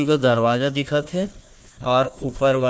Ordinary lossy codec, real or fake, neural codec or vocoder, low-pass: none; fake; codec, 16 kHz, 1 kbps, FunCodec, trained on Chinese and English, 50 frames a second; none